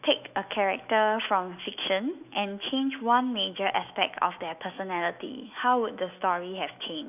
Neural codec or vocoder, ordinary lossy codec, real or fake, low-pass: autoencoder, 48 kHz, 128 numbers a frame, DAC-VAE, trained on Japanese speech; none; fake; 3.6 kHz